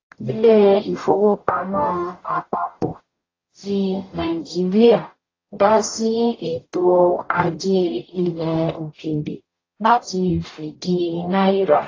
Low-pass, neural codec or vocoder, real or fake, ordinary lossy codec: 7.2 kHz; codec, 44.1 kHz, 0.9 kbps, DAC; fake; AAC, 32 kbps